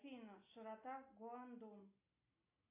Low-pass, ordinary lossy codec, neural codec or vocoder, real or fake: 3.6 kHz; AAC, 24 kbps; none; real